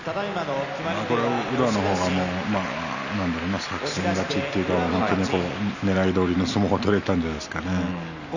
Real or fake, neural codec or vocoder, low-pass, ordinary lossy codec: real; none; 7.2 kHz; none